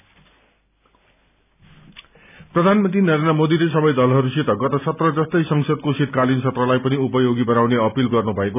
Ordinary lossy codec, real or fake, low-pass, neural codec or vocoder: none; real; 3.6 kHz; none